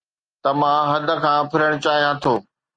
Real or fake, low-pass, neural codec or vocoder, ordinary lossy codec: real; 9.9 kHz; none; Opus, 32 kbps